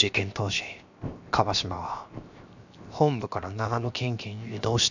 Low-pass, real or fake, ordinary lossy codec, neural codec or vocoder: 7.2 kHz; fake; none; codec, 16 kHz, 0.7 kbps, FocalCodec